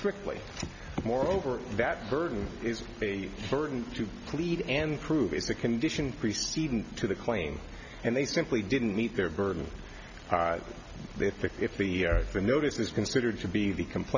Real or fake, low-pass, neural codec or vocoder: real; 7.2 kHz; none